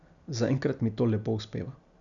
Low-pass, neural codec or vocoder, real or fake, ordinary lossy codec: 7.2 kHz; none; real; none